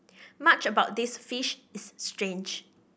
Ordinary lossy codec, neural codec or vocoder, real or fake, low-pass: none; none; real; none